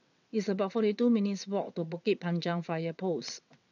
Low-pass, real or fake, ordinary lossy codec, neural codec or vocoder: 7.2 kHz; fake; none; vocoder, 22.05 kHz, 80 mel bands, WaveNeXt